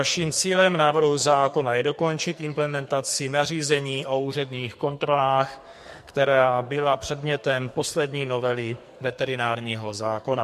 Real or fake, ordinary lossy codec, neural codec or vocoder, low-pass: fake; MP3, 64 kbps; codec, 44.1 kHz, 2.6 kbps, SNAC; 14.4 kHz